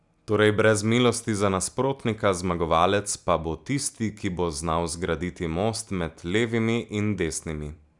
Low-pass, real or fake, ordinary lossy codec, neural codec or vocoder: 10.8 kHz; real; none; none